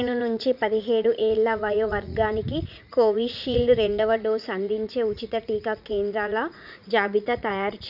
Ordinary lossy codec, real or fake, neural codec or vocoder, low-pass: none; fake; vocoder, 44.1 kHz, 80 mel bands, Vocos; 5.4 kHz